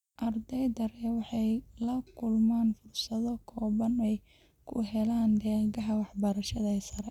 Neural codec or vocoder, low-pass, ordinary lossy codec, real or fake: none; 19.8 kHz; none; real